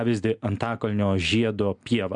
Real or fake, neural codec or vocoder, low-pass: real; none; 9.9 kHz